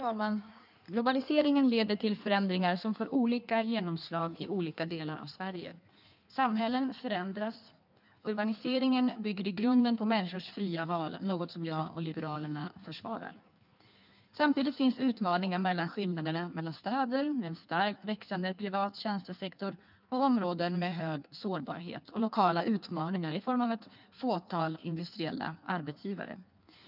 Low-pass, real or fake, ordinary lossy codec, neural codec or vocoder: 5.4 kHz; fake; none; codec, 16 kHz in and 24 kHz out, 1.1 kbps, FireRedTTS-2 codec